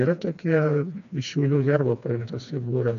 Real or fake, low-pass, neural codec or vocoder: fake; 7.2 kHz; codec, 16 kHz, 2 kbps, FreqCodec, smaller model